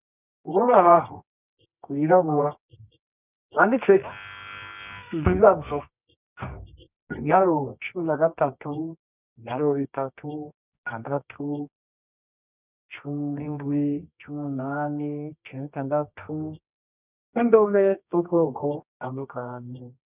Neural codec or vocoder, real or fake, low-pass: codec, 24 kHz, 0.9 kbps, WavTokenizer, medium music audio release; fake; 3.6 kHz